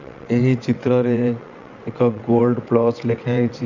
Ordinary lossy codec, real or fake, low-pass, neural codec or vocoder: none; fake; 7.2 kHz; vocoder, 22.05 kHz, 80 mel bands, WaveNeXt